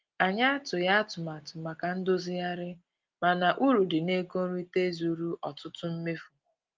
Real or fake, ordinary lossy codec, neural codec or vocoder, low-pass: real; Opus, 32 kbps; none; 7.2 kHz